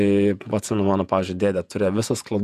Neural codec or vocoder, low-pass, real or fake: vocoder, 48 kHz, 128 mel bands, Vocos; 14.4 kHz; fake